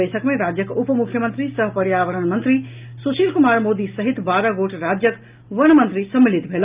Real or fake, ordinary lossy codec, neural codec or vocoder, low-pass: real; Opus, 24 kbps; none; 3.6 kHz